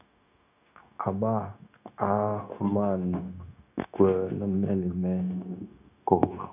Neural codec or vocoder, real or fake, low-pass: codec, 16 kHz, 0.9 kbps, LongCat-Audio-Codec; fake; 3.6 kHz